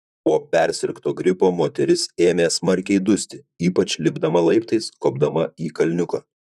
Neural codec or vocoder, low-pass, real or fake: vocoder, 44.1 kHz, 128 mel bands, Pupu-Vocoder; 14.4 kHz; fake